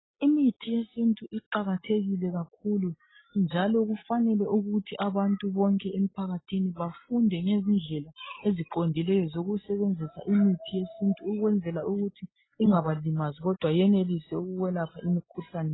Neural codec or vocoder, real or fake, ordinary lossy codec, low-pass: none; real; AAC, 16 kbps; 7.2 kHz